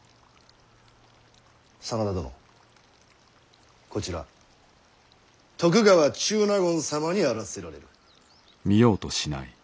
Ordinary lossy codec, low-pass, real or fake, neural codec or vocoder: none; none; real; none